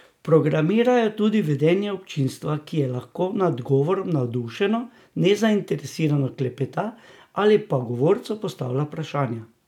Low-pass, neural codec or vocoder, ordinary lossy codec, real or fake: 19.8 kHz; none; none; real